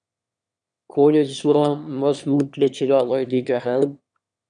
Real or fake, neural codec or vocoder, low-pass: fake; autoencoder, 22.05 kHz, a latent of 192 numbers a frame, VITS, trained on one speaker; 9.9 kHz